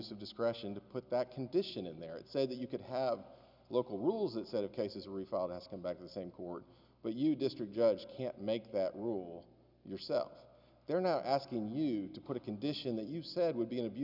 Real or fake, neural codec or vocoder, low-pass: real; none; 5.4 kHz